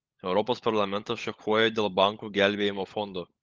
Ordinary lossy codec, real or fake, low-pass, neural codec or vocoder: Opus, 32 kbps; fake; 7.2 kHz; codec, 16 kHz, 16 kbps, FunCodec, trained on LibriTTS, 50 frames a second